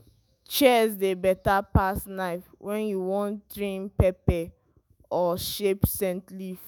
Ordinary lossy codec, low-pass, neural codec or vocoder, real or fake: none; none; autoencoder, 48 kHz, 128 numbers a frame, DAC-VAE, trained on Japanese speech; fake